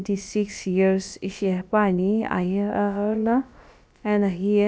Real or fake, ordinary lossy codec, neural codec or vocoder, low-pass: fake; none; codec, 16 kHz, about 1 kbps, DyCAST, with the encoder's durations; none